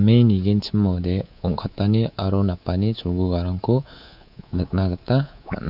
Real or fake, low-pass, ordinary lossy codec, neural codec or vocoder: fake; 5.4 kHz; none; codec, 24 kHz, 3.1 kbps, DualCodec